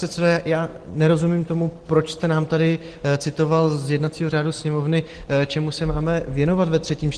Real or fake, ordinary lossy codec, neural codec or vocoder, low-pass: real; Opus, 16 kbps; none; 9.9 kHz